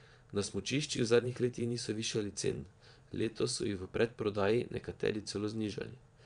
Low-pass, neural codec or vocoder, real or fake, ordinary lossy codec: 9.9 kHz; vocoder, 22.05 kHz, 80 mel bands, Vocos; fake; none